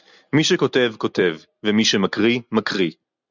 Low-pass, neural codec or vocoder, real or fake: 7.2 kHz; none; real